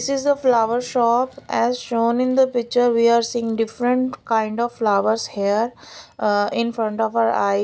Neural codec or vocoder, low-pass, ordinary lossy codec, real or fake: none; none; none; real